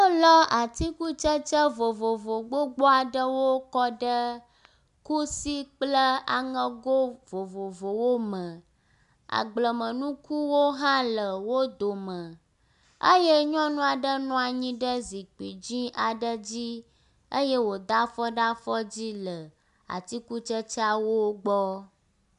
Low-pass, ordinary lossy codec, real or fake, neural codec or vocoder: 10.8 kHz; MP3, 96 kbps; real; none